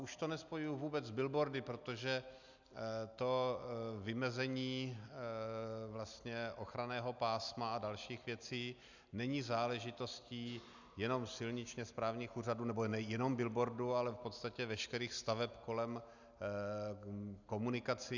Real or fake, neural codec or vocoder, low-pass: real; none; 7.2 kHz